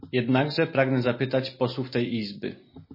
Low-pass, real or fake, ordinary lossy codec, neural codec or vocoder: 5.4 kHz; real; MP3, 24 kbps; none